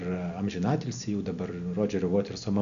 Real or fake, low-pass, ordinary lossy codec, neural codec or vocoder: real; 7.2 kHz; MP3, 96 kbps; none